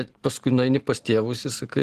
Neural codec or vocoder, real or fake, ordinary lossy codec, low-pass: none; real; Opus, 16 kbps; 14.4 kHz